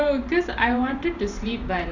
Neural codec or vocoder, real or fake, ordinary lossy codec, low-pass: vocoder, 44.1 kHz, 128 mel bands every 512 samples, BigVGAN v2; fake; none; 7.2 kHz